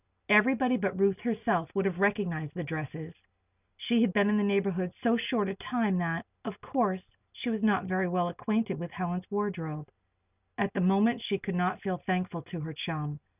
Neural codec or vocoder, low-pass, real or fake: none; 3.6 kHz; real